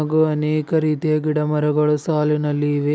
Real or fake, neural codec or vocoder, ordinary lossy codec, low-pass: real; none; none; none